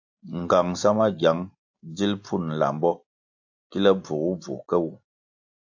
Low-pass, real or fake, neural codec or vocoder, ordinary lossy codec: 7.2 kHz; real; none; AAC, 48 kbps